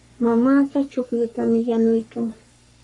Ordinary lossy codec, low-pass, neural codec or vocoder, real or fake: AAC, 64 kbps; 10.8 kHz; codec, 44.1 kHz, 3.4 kbps, Pupu-Codec; fake